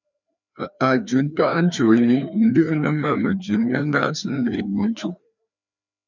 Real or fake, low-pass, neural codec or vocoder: fake; 7.2 kHz; codec, 16 kHz, 2 kbps, FreqCodec, larger model